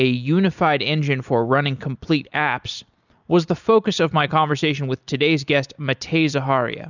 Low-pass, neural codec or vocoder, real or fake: 7.2 kHz; none; real